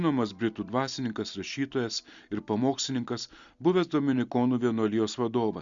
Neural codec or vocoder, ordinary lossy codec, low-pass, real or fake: none; Opus, 64 kbps; 7.2 kHz; real